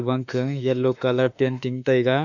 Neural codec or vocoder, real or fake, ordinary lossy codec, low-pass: autoencoder, 48 kHz, 32 numbers a frame, DAC-VAE, trained on Japanese speech; fake; none; 7.2 kHz